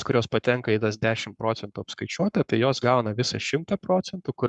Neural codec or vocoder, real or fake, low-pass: codec, 44.1 kHz, 7.8 kbps, Pupu-Codec; fake; 10.8 kHz